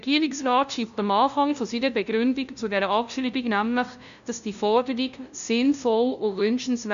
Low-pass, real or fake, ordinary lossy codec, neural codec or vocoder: 7.2 kHz; fake; none; codec, 16 kHz, 0.5 kbps, FunCodec, trained on LibriTTS, 25 frames a second